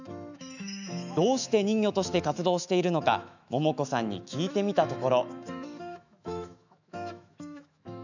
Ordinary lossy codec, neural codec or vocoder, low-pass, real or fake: none; autoencoder, 48 kHz, 128 numbers a frame, DAC-VAE, trained on Japanese speech; 7.2 kHz; fake